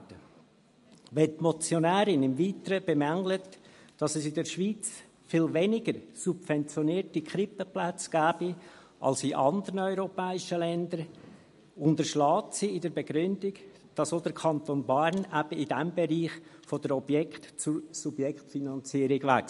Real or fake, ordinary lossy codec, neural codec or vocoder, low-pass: real; MP3, 48 kbps; none; 14.4 kHz